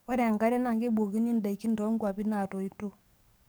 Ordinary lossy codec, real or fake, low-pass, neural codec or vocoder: none; fake; none; codec, 44.1 kHz, 7.8 kbps, DAC